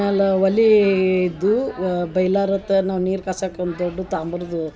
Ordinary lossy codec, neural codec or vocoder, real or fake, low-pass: none; none; real; none